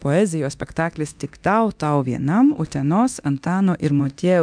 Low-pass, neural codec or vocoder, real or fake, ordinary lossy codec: 9.9 kHz; codec, 24 kHz, 0.9 kbps, DualCodec; fake; MP3, 96 kbps